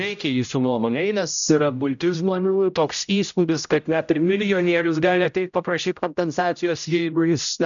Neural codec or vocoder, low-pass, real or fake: codec, 16 kHz, 0.5 kbps, X-Codec, HuBERT features, trained on general audio; 7.2 kHz; fake